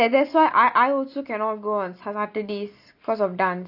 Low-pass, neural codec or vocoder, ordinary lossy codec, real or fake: 5.4 kHz; none; AAC, 48 kbps; real